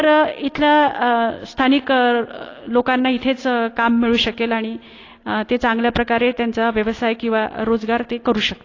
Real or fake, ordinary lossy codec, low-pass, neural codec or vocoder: real; AAC, 32 kbps; 7.2 kHz; none